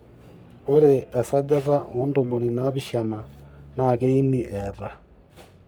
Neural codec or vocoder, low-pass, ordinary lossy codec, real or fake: codec, 44.1 kHz, 3.4 kbps, Pupu-Codec; none; none; fake